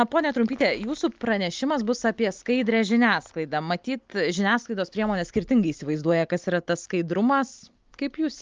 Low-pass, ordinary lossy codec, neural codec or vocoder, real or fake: 7.2 kHz; Opus, 24 kbps; none; real